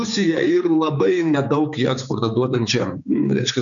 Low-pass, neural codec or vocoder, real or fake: 7.2 kHz; codec, 16 kHz, 4 kbps, X-Codec, HuBERT features, trained on general audio; fake